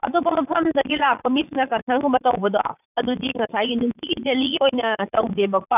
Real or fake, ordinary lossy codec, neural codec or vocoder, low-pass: fake; none; vocoder, 44.1 kHz, 80 mel bands, Vocos; 3.6 kHz